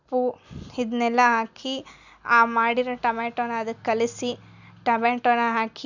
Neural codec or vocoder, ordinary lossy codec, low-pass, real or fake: none; none; 7.2 kHz; real